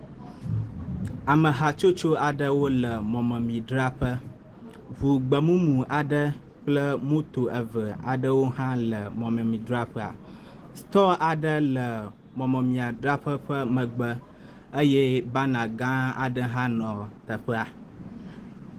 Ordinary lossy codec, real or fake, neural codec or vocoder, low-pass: Opus, 16 kbps; real; none; 14.4 kHz